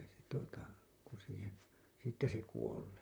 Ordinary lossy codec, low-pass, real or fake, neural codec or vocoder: none; none; fake; vocoder, 44.1 kHz, 128 mel bands, Pupu-Vocoder